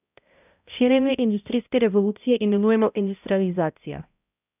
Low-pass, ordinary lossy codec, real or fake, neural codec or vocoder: 3.6 kHz; none; fake; codec, 16 kHz, 0.5 kbps, X-Codec, HuBERT features, trained on balanced general audio